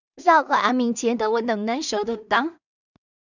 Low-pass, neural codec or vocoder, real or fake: 7.2 kHz; codec, 16 kHz in and 24 kHz out, 0.4 kbps, LongCat-Audio-Codec, two codebook decoder; fake